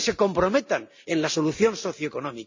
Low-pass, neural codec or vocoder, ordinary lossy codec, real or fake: 7.2 kHz; none; MP3, 48 kbps; real